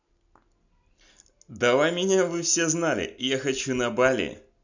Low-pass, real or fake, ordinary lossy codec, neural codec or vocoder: 7.2 kHz; real; none; none